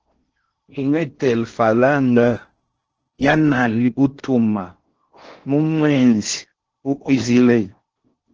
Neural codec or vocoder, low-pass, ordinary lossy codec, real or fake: codec, 16 kHz in and 24 kHz out, 0.6 kbps, FocalCodec, streaming, 4096 codes; 7.2 kHz; Opus, 16 kbps; fake